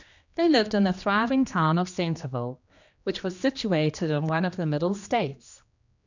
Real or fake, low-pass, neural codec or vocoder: fake; 7.2 kHz; codec, 16 kHz, 2 kbps, X-Codec, HuBERT features, trained on general audio